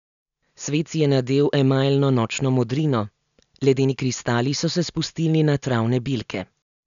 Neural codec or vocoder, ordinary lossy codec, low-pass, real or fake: none; none; 7.2 kHz; real